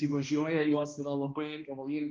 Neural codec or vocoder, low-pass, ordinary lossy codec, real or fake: codec, 16 kHz, 1 kbps, X-Codec, HuBERT features, trained on balanced general audio; 7.2 kHz; Opus, 32 kbps; fake